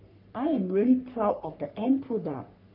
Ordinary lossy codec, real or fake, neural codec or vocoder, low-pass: none; fake; codec, 44.1 kHz, 3.4 kbps, Pupu-Codec; 5.4 kHz